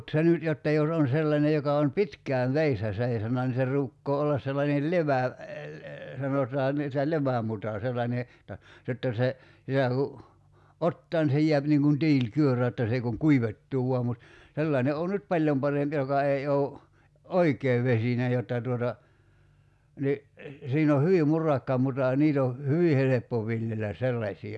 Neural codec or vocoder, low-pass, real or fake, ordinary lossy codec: none; none; real; none